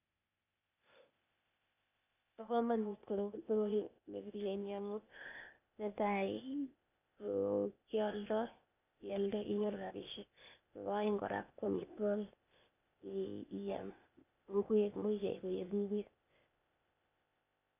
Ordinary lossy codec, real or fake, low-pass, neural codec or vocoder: none; fake; 3.6 kHz; codec, 16 kHz, 0.8 kbps, ZipCodec